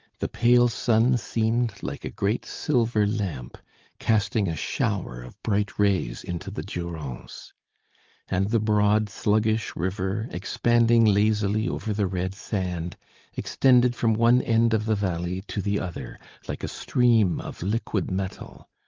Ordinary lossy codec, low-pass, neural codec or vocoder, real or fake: Opus, 32 kbps; 7.2 kHz; none; real